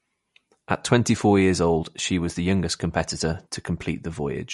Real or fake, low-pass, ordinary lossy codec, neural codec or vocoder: real; 19.8 kHz; MP3, 48 kbps; none